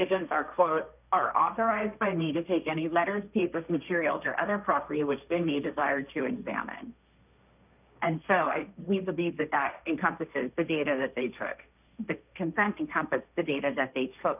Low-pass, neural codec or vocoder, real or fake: 3.6 kHz; codec, 16 kHz, 1.1 kbps, Voila-Tokenizer; fake